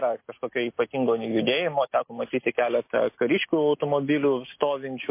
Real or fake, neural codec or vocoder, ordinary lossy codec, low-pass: real; none; MP3, 24 kbps; 3.6 kHz